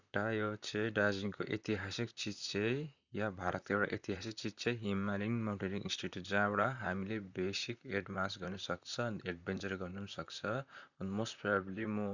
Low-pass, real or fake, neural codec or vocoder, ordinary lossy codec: 7.2 kHz; fake; vocoder, 44.1 kHz, 128 mel bands, Pupu-Vocoder; none